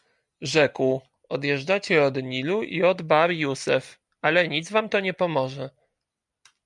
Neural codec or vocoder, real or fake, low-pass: none; real; 10.8 kHz